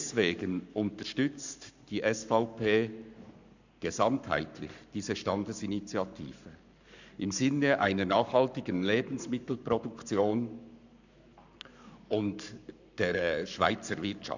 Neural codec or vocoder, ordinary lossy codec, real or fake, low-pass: codec, 44.1 kHz, 7.8 kbps, Pupu-Codec; none; fake; 7.2 kHz